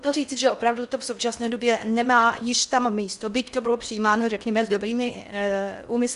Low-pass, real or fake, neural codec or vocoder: 10.8 kHz; fake; codec, 16 kHz in and 24 kHz out, 0.6 kbps, FocalCodec, streaming, 4096 codes